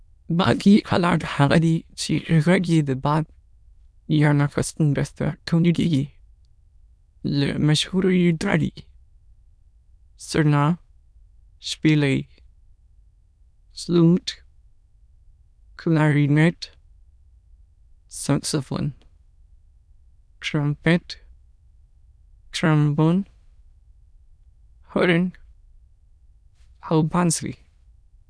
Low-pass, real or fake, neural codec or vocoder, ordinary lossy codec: none; fake; autoencoder, 22.05 kHz, a latent of 192 numbers a frame, VITS, trained on many speakers; none